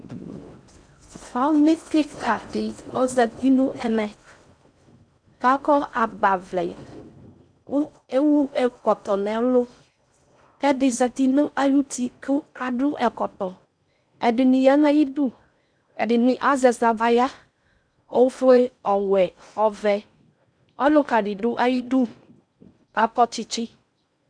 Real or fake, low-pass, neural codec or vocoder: fake; 9.9 kHz; codec, 16 kHz in and 24 kHz out, 0.6 kbps, FocalCodec, streaming, 4096 codes